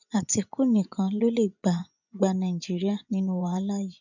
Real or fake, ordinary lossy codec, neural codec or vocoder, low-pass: fake; none; vocoder, 24 kHz, 100 mel bands, Vocos; 7.2 kHz